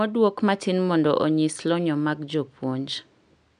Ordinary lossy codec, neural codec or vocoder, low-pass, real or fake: none; none; 9.9 kHz; real